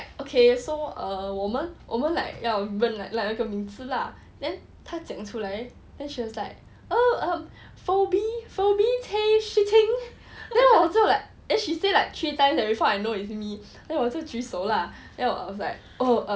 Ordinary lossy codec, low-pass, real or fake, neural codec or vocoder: none; none; real; none